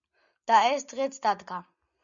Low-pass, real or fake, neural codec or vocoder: 7.2 kHz; real; none